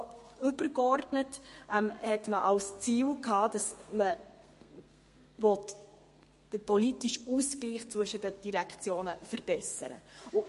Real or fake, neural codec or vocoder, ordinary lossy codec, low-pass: fake; codec, 32 kHz, 1.9 kbps, SNAC; MP3, 48 kbps; 14.4 kHz